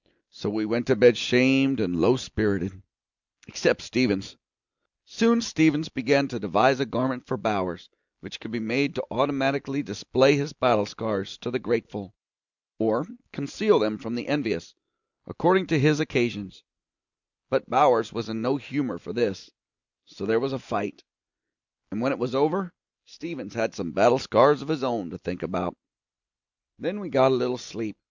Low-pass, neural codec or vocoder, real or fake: 7.2 kHz; none; real